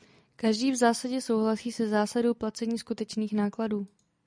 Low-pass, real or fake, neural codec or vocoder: 9.9 kHz; real; none